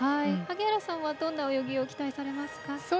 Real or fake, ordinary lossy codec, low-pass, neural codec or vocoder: real; none; none; none